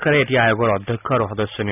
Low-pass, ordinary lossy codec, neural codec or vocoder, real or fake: 3.6 kHz; none; none; real